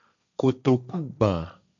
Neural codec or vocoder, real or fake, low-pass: codec, 16 kHz, 1.1 kbps, Voila-Tokenizer; fake; 7.2 kHz